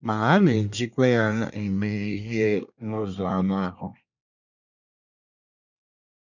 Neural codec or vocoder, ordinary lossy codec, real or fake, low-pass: codec, 24 kHz, 1 kbps, SNAC; none; fake; 7.2 kHz